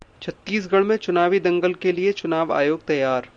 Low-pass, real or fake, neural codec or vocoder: 9.9 kHz; real; none